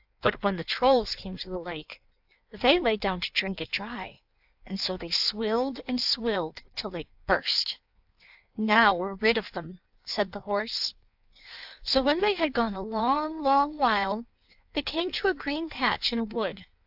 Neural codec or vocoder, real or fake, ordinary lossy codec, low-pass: codec, 16 kHz in and 24 kHz out, 1.1 kbps, FireRedTTS-2 codec; fake; AAC, 48 kbps; 5.4 kHz